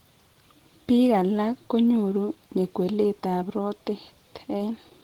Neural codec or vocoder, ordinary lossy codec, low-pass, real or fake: none; Opus, 16 kbps; 19.8 kHz; real